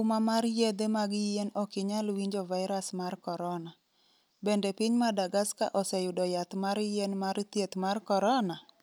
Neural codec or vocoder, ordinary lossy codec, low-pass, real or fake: none; none; none; real